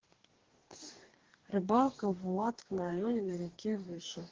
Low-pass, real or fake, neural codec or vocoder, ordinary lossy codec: 7.2 kHz; fake; codec, 44.1 kHz, 2.6 kbps, DAC; Opus, 16 kbps